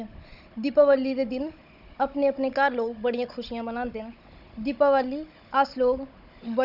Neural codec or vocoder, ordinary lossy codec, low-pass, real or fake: codec, 16 kHz, 16 kbps, FunCodec, trained on Chinese and English, 50 frames a second; none; 5.4 kHz; fake